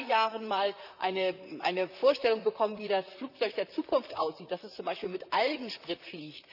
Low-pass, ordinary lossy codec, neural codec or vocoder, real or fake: 5.4 kHz; none; vocoder, 44.1 kHz, 128 mel bands, Pupu-Vocoder; fake